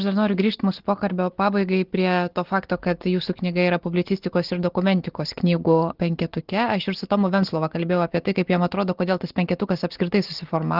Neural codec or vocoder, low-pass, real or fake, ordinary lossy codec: none; 5.4 kHz; real; Opus, 16 kbps